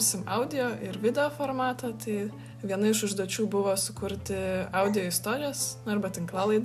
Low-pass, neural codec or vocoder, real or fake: 14.4 kHz; none; real